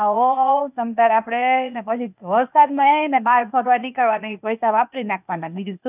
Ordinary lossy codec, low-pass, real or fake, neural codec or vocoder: none; 3.6 kHz; fake; codec, 16 kHz, 0.8 kbps, ZipCodec